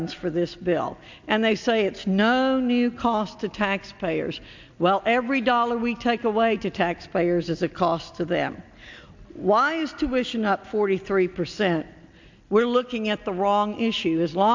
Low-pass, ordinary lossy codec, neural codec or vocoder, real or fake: 7.2 kHz; MP3, 64 kbps; none; real